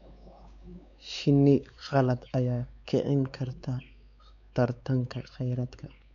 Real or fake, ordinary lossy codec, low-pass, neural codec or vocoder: fake; none; 7.2 kHz; codec, 16 kHz, 4 kbps, X-Codec, WavLM features, trained on Multilingual LibriSpeech